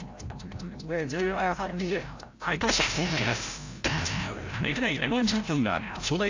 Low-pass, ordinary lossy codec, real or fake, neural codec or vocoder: 7.2 kHz; AAC, 48 kbps; fake; codec, 16 kHz, 0.5 kbps, FreqCodec, larger model